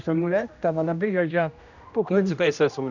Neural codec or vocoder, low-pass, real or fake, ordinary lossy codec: codec, 16 kHz, 1 kbps, X-Codec, HuBERT features, trained on general audio; 7.2 kHz; fake; none